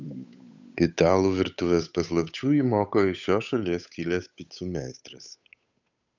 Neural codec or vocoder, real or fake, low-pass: codec, 16 kHz, 8 kbps, FunCodec, trained on Chinese and English, 25 frames a second; fake; 7.2 kHz